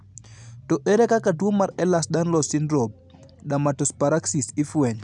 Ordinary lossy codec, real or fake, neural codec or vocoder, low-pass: none; real; none; 10.8 kHz